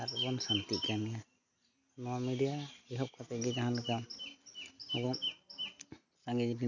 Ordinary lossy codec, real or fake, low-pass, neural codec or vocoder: none; real; 7.2 kHz; none